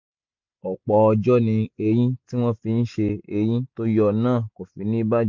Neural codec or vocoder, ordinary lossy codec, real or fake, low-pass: none; MP3, 48 kbps; real; 7.2 kHz